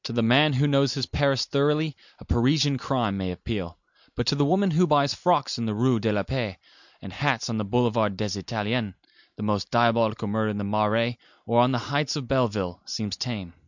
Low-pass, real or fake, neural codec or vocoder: 7.2 kHz; real; none